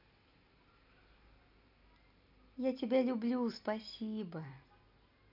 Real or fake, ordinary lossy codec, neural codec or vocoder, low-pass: real; none; none; 5.4 kHz